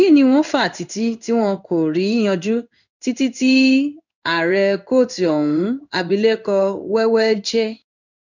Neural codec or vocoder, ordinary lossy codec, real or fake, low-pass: codec, 16 kHz in and 24 kHz out, 1 kbps, XY-Tokenizer; none; fake; 7.2 kHz